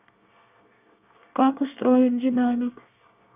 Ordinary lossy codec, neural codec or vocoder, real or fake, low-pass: none; codec, 24 kHz, 1 kbps, SNAC; fake; 3.6 kHz